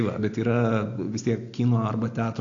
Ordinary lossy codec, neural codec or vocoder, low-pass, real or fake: AAC, 48 kbps; none; 7.2 kHz; real